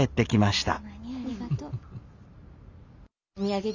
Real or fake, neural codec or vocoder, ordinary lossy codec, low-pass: real; none; none; 7.2 kHz